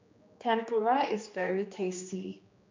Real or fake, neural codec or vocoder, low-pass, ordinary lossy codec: fake; codec, 16 kHz, 2 kbps, X-Codec, HuBERT features, trained on general audio; 7.2 kHz; MP3, 64 kbps